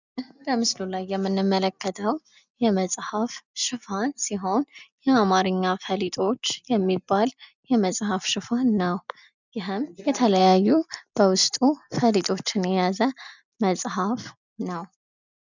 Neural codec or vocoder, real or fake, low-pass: none; real; 7.2 kHz